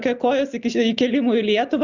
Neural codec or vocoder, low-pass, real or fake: none; 7.2 kHz; real